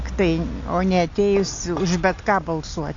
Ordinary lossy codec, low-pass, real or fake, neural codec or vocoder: AAC, 48 kbps; 7.2 kHz; real; none